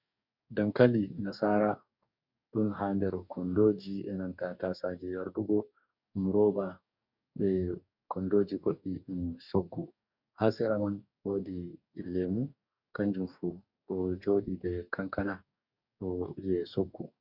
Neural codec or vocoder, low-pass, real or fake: codec, 44.1 kHz, 2.6 kbps, DAC; 5.4 kHz; fake